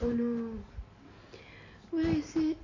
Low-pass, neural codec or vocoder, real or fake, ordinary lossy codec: 7.2 kHz; none; real; MP3, 48 kbps